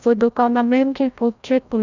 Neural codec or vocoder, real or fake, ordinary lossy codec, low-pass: codec, 16 kHz, 0.5 kbps, FreqCodec, larger model; fake; none; 7.2 kHz